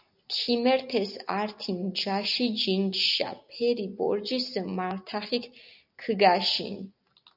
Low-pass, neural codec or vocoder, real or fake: 5.4 kHz; none; real